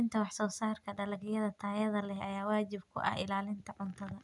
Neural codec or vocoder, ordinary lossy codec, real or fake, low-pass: none; none; real; 10.8 kHz